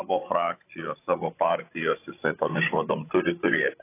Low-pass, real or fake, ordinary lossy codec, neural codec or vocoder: 3.6 kHz; fake; AAC, 24 kbps; codec, 16 kHz, 16 kbps, FreqCodec, larger model